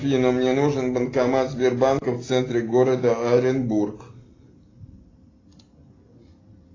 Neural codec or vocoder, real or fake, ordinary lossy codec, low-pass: none; real; AAC, 32 kbps; 7.2 kHz